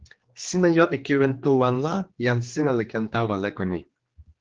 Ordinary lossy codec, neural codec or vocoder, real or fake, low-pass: Opus, 16 kbps; codec, 16 kHz, 2 kbps, X-Codec, HuBERT features, trained on general audio; fake; 7.2 kHz